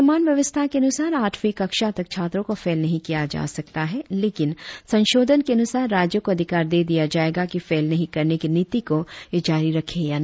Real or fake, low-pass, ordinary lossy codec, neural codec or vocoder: real; none; none; none